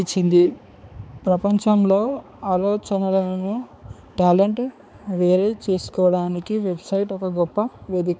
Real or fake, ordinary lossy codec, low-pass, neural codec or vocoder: fake; none; none; codec, 16 kHz, 4 kbps, X-Codec, HuBERT features, trained on balanced general audio